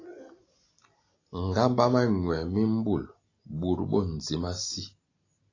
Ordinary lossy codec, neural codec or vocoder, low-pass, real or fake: AAC, 32 kbps; none; 7.2 kHz; real